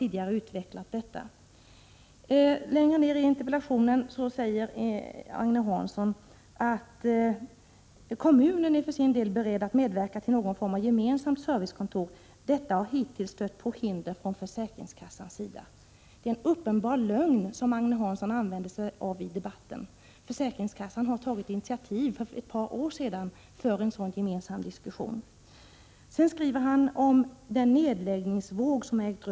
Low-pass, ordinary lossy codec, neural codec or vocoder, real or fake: none; none; none; real